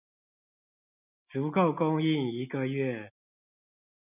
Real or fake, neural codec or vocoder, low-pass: real; none; 3.6 kHz